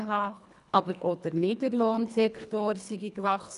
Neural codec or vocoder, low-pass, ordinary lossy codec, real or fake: codec, 24 kHz, 1.5 kbps, HILCodec; 10.8 kHz; none; fake